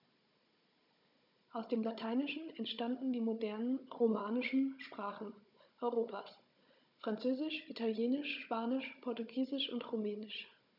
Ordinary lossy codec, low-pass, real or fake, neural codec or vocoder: none; 5.4 kHz; fake; codec, 16 kHz, 16 kbps, FunCodec, trained on Chinese and English, 50 frames a second